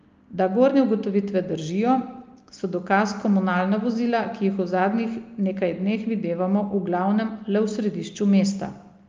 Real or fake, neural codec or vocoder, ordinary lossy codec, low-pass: real; none; Opus, 32 kbps; 7.2 kHz